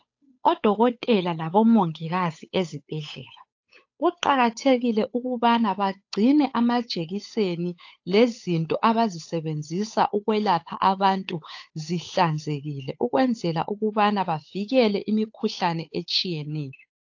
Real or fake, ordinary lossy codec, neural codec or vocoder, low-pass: fake; AAC, 48 kbps; codec, 16 kHz, 8 kbps, FunCodec, trained on Chinese and English, 25 frames a second; 7.2 kHz